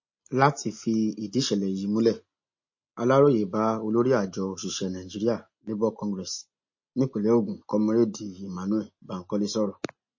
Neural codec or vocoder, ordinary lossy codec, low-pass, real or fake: none; MP3, 32 kbps; 7.2 kHz; real